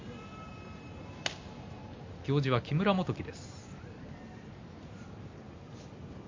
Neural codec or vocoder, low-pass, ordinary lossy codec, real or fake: none; 7.2 kHz; MP3, 48 kbps; real